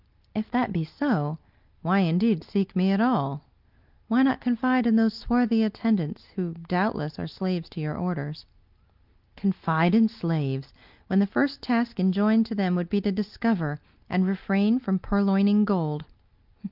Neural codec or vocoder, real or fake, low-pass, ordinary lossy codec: none; real; 5.4 kHz; Opus, 32 kbps